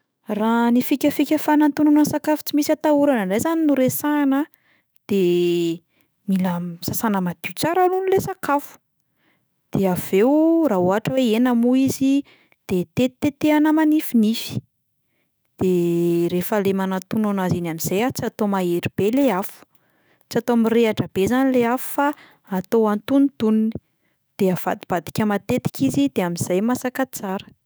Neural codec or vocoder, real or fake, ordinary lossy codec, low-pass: autoencoder, 48 kHz, 128 numbers a frame, DAC-VAE, trained on Japanese speech; fake; none; none